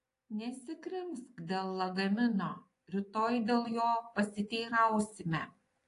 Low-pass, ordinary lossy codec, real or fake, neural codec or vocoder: 10.8 kHz; AAC, 48 kbps; fake; vocoder, 24 kHz, 100 mel bands, Vocos